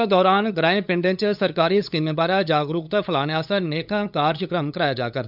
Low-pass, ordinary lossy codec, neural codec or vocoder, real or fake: 5.4 kHz; none; codec, 16 kHz, 8 kbps, FunCodec, trained on LibriTTS, 25 frames a second; fake